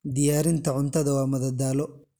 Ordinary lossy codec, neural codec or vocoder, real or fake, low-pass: none; none; real; none